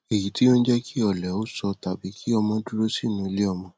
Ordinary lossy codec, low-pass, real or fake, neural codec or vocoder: none; none; real; none